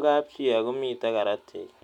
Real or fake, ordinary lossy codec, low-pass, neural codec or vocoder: real; none; 19.8 kHz; none